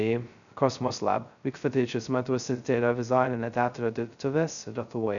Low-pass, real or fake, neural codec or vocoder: 7.2 kHz; fake; codec, 16 kHz, 0.2 kbps, FocalCodec